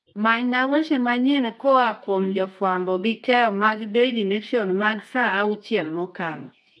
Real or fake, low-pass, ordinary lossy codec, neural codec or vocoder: fake; none; none; codec, 24 kHz, 0.9 kbps, WavTokenizer, medium music audio release